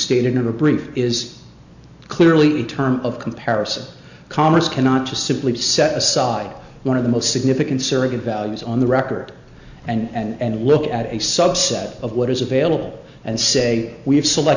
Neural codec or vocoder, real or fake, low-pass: none; real; 7.2 kHz